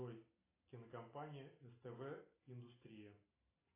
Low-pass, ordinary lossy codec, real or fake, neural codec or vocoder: 3.6 kHz; AAC, 16 kbps; real; none